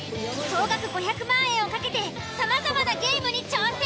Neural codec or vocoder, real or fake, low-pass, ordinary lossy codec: none; real; none; none